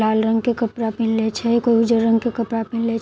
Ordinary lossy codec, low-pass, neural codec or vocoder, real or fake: none; none; none; real